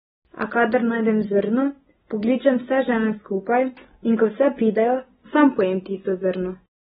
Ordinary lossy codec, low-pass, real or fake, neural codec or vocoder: AAC, 16 kbps; 10.8 kHz; real; none